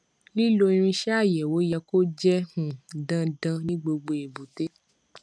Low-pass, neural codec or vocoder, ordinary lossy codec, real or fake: none; none; none; real